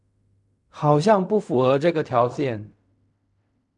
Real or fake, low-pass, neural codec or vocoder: fake; 10.8 kHz; codec, 16 kHz in and 24 kHz out, 0.4 kbps, LongCat-Audio-Codec, fine tuned four codebook decoder